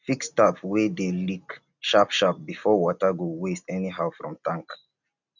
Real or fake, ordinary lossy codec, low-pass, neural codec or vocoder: real; none; 7.2 kHz; none